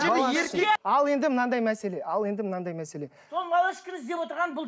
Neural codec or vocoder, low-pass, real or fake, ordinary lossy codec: none; none; real; none